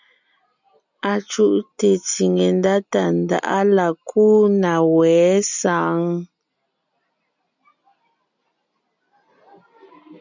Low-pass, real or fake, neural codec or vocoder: 7.2 kHz; real; none